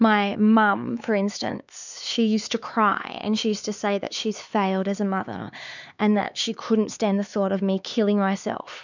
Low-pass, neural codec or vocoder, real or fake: 7.2 kHz; codec, 16 kHz, 4 kbps, X-Codec, HuBERT features, trained on LibriSpeech; fake